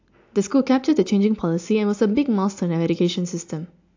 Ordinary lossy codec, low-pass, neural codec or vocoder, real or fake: AAC, 48 kbps; 7.2 kHz; none; real